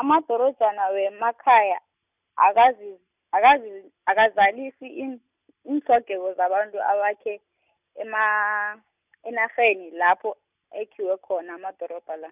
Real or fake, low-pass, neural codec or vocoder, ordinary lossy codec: real; 3.6 kHz; none; none